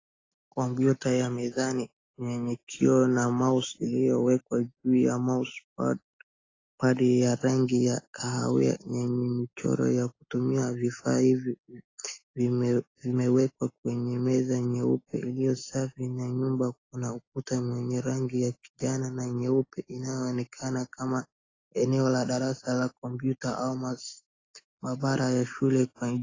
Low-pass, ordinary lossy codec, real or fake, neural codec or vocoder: 7.2 kHz; AAC, 32 kbps; real; none